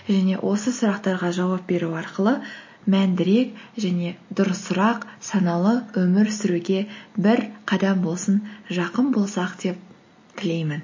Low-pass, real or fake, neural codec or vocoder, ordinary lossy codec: 7.2 kHz; real; none; MP3, 32 kbps